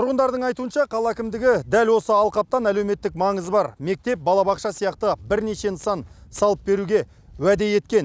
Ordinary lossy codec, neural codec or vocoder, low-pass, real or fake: none; none; none; real